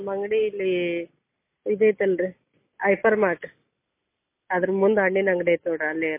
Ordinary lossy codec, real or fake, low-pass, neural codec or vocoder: MP3, 24 kbps; real; 3.6 kHz; none